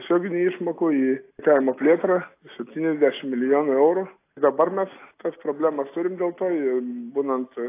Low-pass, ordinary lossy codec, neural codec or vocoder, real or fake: 3.6 kHz; AAC, 24 kbps; none; real